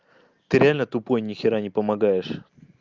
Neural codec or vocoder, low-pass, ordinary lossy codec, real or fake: none; 7.2 kHz; Opus, 32 kbps; real